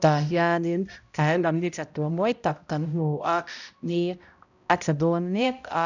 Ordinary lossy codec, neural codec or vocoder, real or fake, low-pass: none; codec, 16 kHz, 0.5 kbps, X-Codec, HuBERT features, trained on balanced general audio; fake; 7.2 kHz